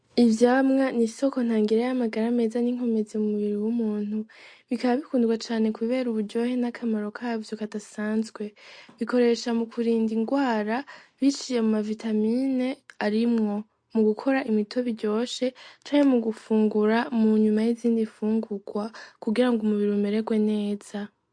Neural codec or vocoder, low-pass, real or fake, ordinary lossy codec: none; 9.9 kHz; real; MP3, 48 kbps